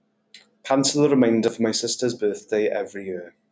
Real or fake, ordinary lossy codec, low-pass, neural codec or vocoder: real; none; none; none